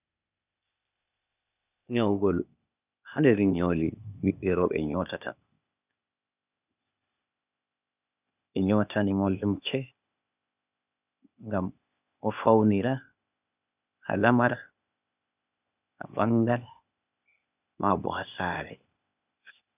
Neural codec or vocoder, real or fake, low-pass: codec, 16 kHz, 0.8 kbps, ZipCodec; fake; 3.6 kHz